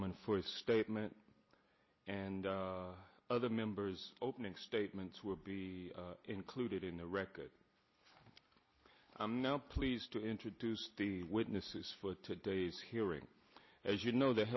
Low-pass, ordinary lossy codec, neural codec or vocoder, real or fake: 7.2 kHz; MP3, 24 kbps; none; real